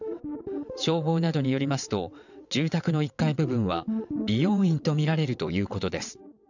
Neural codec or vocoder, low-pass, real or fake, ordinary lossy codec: vocoder, 22.05 kHz, 80 mel bands, WaveNeXt; 7.2 kHz; fake; none